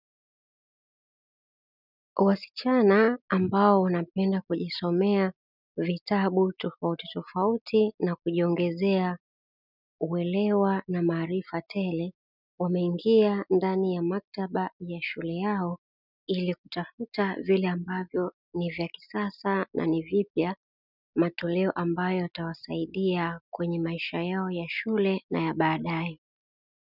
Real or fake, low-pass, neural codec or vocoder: real; 5.4 kHz; none